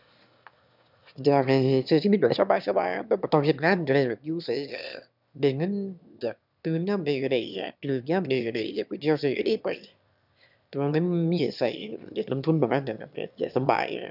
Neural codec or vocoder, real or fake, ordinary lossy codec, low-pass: autoencoder, 22.05 kHz, a latent of 192 numbers a frame, VITS, trained on one speaker; fake; none; 5.4 kHz